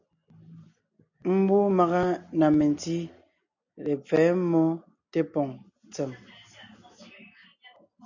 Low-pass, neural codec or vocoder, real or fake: 7.2 kHz; none; real